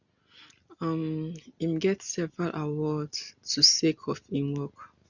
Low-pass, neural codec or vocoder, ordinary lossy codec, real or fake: 7.2 kHz; none; none; real